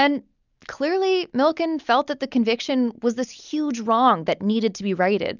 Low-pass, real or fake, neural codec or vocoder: 7.2 kHz; real; none